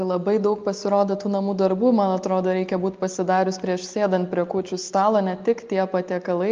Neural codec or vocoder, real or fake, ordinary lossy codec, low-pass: none; real; Opus, 24 kbps; 7.2 kHz